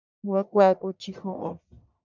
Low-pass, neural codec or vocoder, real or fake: 7.2 kHz; codec, 44.1 kHz, 1.7 kbps, Pupu-Codec; fake